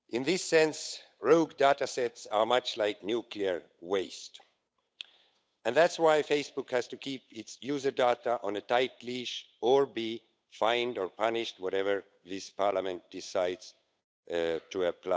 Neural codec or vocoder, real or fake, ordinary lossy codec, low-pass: codec, 16 kHz, 8 kbps, FunCodec, trained on Chinese and English, 25 frames a second; fake; none; none